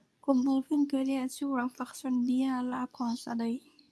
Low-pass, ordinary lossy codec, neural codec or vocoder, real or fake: none; none; codec, 24 kHz, 0.9 kbps, WavTokenizer, medium speech release version 2; fake